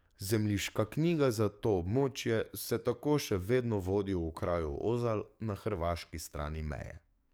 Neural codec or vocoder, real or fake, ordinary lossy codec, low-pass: codec, 44.1 kHz, 7.8 kbps, DAC; fake; none; none